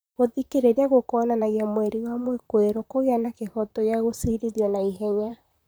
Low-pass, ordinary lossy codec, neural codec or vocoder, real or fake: none; none; vocoder, 44.1 kHz, 128 mel bands, Pupu-Vocoder; fake